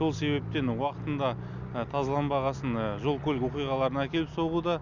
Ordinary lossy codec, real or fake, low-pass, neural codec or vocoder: none; real; 7.2 kHz; none